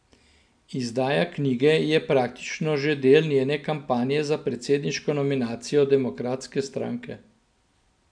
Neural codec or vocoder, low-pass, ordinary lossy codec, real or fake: none; 9.9 kHz; none; real